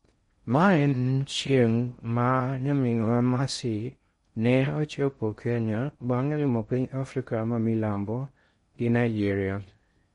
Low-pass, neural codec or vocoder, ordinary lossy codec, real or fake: 10.8 kHz; codec, 16 kHz in and 24 kHz out, 0.6 kbps, FocalCodec, streaming, 2048 codes; MP3, 48 kbps; fake